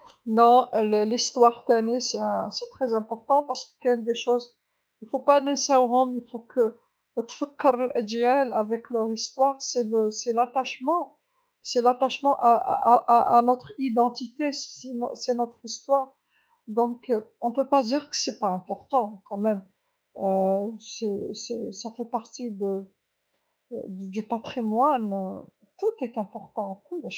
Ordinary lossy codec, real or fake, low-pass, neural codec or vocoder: none; fake; none; autoencoder, 48 kHz, 32 numbers a frame, DAC-VAE, trained on Japanese speech